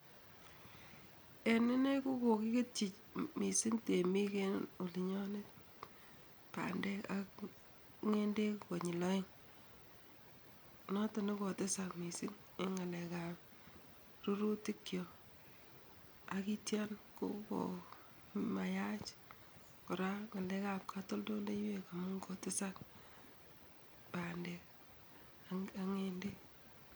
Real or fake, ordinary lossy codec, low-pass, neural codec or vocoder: real; none; none; none